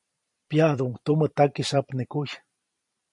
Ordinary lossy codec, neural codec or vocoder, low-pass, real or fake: MP3, 48 kbps; vocoder, 44.1 kHz, 128 mel bands every 512 samples, BigVGAN v2; 10.8 kHz; fake